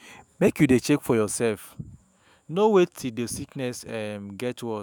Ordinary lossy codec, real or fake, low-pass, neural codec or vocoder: none; fake; none; autoencoder, 48 kHz, 128 numbers a frame, DAC-VAE, trained on Japanese speech